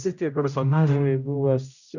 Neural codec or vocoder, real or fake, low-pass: codec, 16 kHz, 0.5 kbps, X-Codec, HuBERT features, trained on general audio; fake; 7.2 kHz